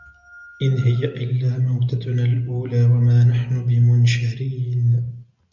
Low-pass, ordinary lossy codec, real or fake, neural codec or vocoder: 7.2 kHz; MP3, 64 kbps; real; none